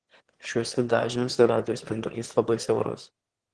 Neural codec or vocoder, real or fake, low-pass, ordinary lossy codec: autoencoder, 22.05 kHz, a latent of 192 numbers a frame, VITS, trained on one speaker; fake; 9.9 kHz; Opus, 16 kbps